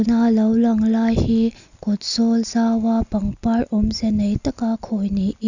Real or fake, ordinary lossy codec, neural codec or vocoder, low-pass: real; none; none; 7.2 kHz